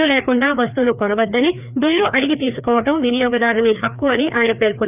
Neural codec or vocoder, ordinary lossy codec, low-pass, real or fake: codec, 16 kHz, 2 kbps, FreqCodec, larger model; none; 3.6 kHz; fake